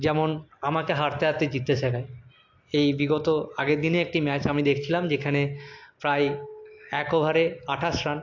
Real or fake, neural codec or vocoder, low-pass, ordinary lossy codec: real; none; 7.2 kHz; AAC, 48 kbps